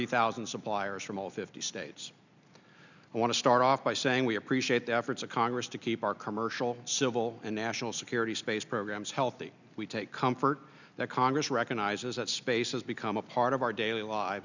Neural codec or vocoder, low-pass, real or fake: none; 7.2 kHz; real